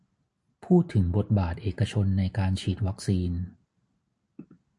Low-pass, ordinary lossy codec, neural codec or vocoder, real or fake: 10.8 kHz; MP3, 64 kbps; none; real